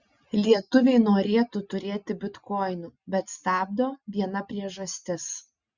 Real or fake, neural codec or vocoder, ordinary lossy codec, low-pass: real; none; Opus, 64 kbps; 7.2 kHz